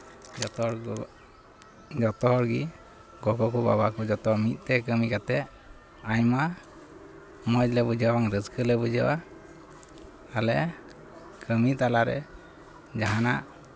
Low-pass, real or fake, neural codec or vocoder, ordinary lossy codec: none; real; none; none